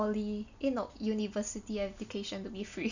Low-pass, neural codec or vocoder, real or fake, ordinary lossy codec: 7.2 kHz; none; real; none